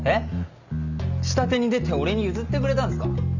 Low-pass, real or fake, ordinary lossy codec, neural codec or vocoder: 7.2 kHz; real; none; none